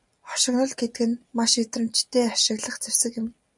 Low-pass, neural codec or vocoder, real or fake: 10.8 kHz; none; real